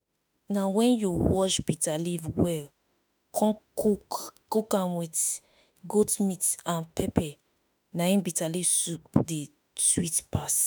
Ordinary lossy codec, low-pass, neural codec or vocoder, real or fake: none; none; autoencoder, 48 kHz, 32 numbers a frame, DAC-VAE, trained on Japanese speech; fake